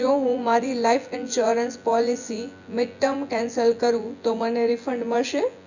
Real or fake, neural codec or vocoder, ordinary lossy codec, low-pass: fake; vocoder, 24 kHz, 100 mel bands, Vocos; none; 7.2 kHz